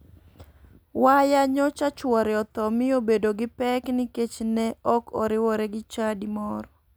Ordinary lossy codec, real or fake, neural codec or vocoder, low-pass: none; real; none; none